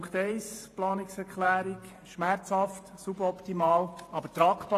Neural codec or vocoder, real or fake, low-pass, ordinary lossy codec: vocoder, 48 kHz, 128 mel bands, Vocos; fake; 14.4 kHz; AAC, 48 kbps